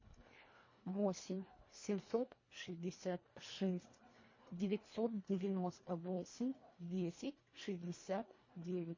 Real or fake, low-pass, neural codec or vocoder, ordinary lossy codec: fake; 7.2 kHz; codec, 24 kHz, 1.5 kbps, HILCodec; MP3, 32 kbps